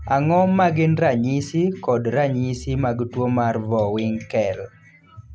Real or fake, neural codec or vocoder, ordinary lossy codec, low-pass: real; none; none; none